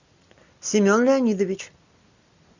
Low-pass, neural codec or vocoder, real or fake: 7.2 kHz; none; real